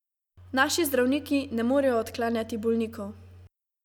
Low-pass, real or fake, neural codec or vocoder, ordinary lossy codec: 19.8 kHz; real; none; none